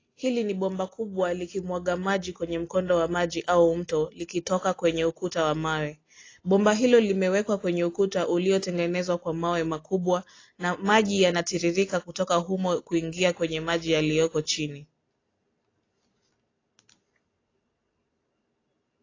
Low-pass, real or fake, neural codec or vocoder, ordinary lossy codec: 7.2 kHz; real; none; AAC, 32 kbps